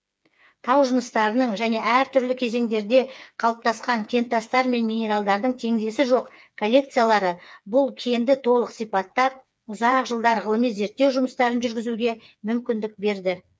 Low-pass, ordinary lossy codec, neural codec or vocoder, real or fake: none; none; codec, 16 kHz, 4 kbps, FreqCodec, smaller model; fake